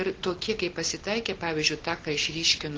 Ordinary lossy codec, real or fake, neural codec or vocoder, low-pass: Opus, 16 kbps; real; none; 7.2 kHz